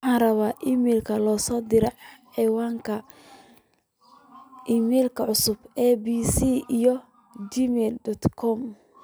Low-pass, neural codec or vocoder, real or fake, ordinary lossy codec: none; none; real; none